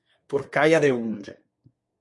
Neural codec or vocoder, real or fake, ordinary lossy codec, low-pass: codec, 24 kHz, 1 kbps, SNAC; fake; MP3, 48 kbps; 10.8 kHz